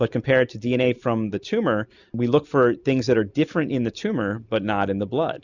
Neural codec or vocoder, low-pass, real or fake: none; 7.2 kHz; real